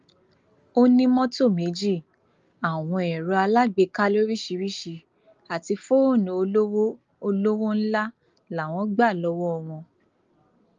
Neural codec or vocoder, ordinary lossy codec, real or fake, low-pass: none; Opus, 32 kbps; real; 7.2 kHz